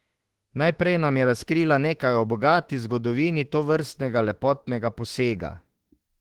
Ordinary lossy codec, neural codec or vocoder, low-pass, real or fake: Opus, 16 kbps; autoencoder, 48 kHz, 32 numbers a frame, DAC-VAE, trained on Japanese speech; 19.8 kHz; fake